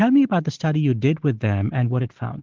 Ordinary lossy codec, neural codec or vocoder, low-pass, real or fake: Opus, 16 kbps; none; 7.2 kHz; real